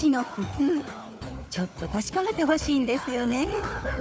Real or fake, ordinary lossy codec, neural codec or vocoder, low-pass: fake; none; codec, 16 kHz, 4 kbps, FunCodec, trained on Chinese and English, 50 frames a second; none